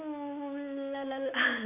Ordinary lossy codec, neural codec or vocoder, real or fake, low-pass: none; none; real; 3.6 kHz